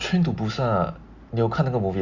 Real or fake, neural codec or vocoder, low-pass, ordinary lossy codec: real; none; 7.2 kHz; Opus, 64 kbps